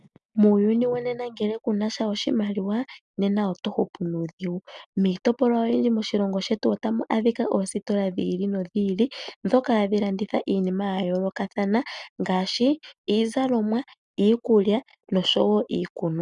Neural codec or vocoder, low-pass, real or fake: none; 10.8 kHz; real